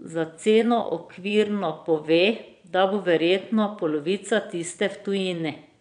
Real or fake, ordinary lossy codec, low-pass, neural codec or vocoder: fake; none; 9.9 kHz; vocoder, 22.05 kHz, 80 mel bands, Vocos